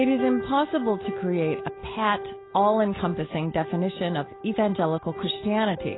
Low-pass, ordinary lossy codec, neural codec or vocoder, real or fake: 7.2 kHz; AAC, 16 kbps; none; real